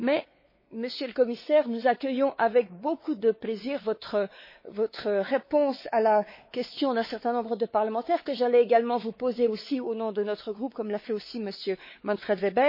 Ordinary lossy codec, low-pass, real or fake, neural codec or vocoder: MP3, 24 kbps; 5.4 kHz; fake; codec, 16 kHz, 4 kbps, X-Codec, WavLM features, trained on Multilingual LibriSpeech